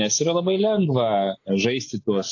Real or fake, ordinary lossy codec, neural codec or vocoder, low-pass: real; AAC, 48 kbps; none; 7.2 kHz